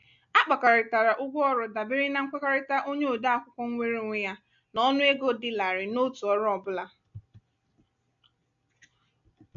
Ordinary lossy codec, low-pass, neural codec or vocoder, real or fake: none; 7.2 kHz; none; real